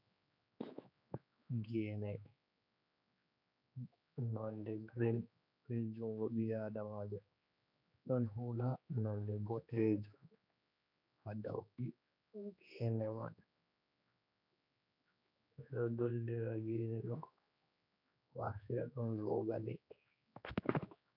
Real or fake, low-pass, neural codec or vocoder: fake; 5.4 kHz; codec, 16 kHz, 2 kbps, X-Codec, HuBERT features, trained on general audio